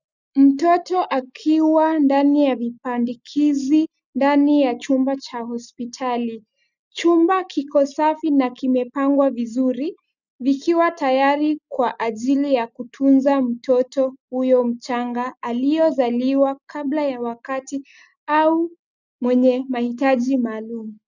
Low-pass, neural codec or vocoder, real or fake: 7.2 kHz; none; real